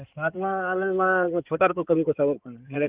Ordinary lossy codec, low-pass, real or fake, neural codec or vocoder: Opus, 32 kbps; 3.6 kHz; fake; codec, 16 kHz in and 24 kHz out, 2.2 kbps, FireRedTTS-2 codec